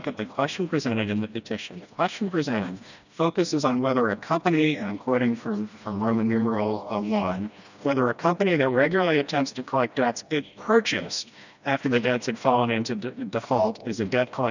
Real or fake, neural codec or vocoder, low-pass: fake; codec, 16 kHz, 1 kbps, FreqCodec, smaller model; 7.2 kHz